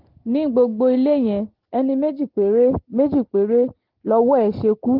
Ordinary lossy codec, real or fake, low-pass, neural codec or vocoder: Opus, 16 kbps; real; 5.4 kHz; none